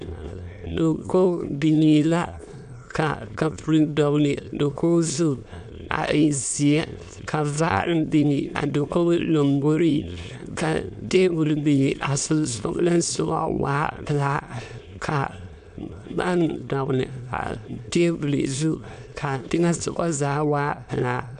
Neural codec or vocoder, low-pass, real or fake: autoencoder, 22.05 kHz, a latent of 192 numbers a frame, VITS, trained on many speakers; 9.9 kHz; fake